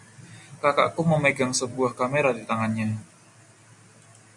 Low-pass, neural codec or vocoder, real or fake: 10.8 kHz; none; real